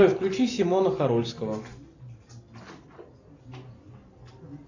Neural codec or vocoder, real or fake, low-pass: none; real; 7.2 kHz